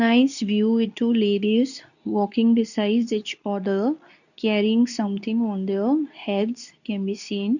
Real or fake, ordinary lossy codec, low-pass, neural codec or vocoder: fake; none; 7.2 kHz; codec, 24 kHz, 0.9 kbps, WavTokenizer, medium speech release version 2